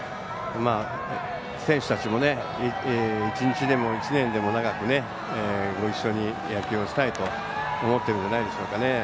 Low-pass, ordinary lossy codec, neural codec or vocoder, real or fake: none; none; none; real